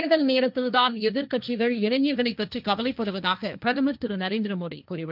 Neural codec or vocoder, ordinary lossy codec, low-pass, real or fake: codec, 16 kHz, 1.1 kbps, Voila-Tokenizer; none; 5.4 kHz; fake